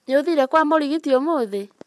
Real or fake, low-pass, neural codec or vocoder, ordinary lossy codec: fake; none; vocoder, 24 kHz, 100 mel bands, Vocos; none